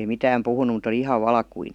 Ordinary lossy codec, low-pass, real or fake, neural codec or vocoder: none; 19.8 kHz; real; none